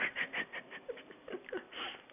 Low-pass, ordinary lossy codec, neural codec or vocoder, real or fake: 3.6 kHz; none; none; real